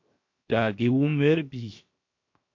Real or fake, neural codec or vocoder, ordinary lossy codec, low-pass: fake; codec, 16 kHz, 0.7 kbps, FocalCodec; MP3, 48 kbps; 7.2 kHz